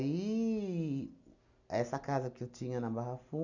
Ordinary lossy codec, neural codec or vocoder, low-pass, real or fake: none; none; 7.2 kHz; real